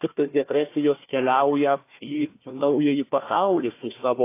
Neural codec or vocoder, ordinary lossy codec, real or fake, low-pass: codec, 16 kHz, 1 kbps, FunCodec, trained on Chinese and English, 50 frames a second; AAC, 24 kbps; fake; 3.6 kHz